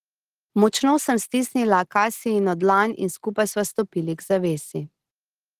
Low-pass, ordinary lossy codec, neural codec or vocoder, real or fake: 14.4 kHz; Opus, 16 kbps; none; real